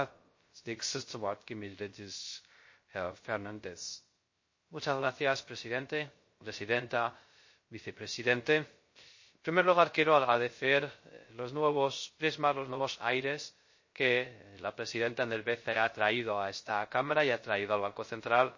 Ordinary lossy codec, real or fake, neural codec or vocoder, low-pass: MP3, 32 kbps; fake; codec, 16 kHz, 0.3 kbps, FocalCodec; 7.2 kHz